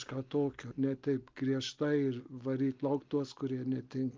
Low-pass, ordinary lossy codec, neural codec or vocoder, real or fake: 7.2 kHz; Opus, 24 kbps; none; real